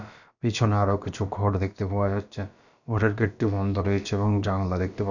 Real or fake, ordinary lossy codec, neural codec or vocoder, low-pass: fake; none; codec, 16 kHz, about 1 kbps, DyCAST, with the encoder's durations; 7.2 kHz